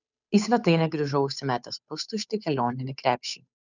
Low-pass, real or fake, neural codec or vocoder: 7.2 kHz; fake; codec, 16 kHz, 8 kbps, FunCodec, trained on Chinese and English, 25 frames a second